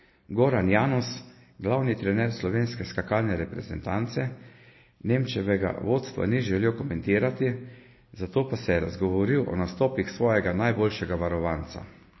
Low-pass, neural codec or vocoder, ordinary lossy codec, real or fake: 7.2 kHz; none; MP3, 24 kbps; real